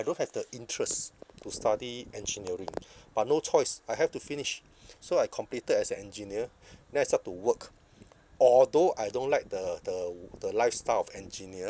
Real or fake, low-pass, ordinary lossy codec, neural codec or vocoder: real; none; none; none